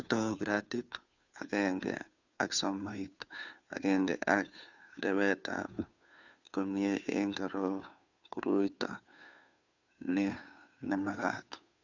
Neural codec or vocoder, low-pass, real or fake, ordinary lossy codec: codec, 16 kHz, 2 kbps, FunCodec, trained on Chinese and English, 25 frames a second; 7.2 kHz; fake; none